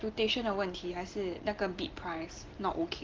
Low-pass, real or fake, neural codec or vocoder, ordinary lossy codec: 7.2 kHz; real; none; Opus, 16 kbps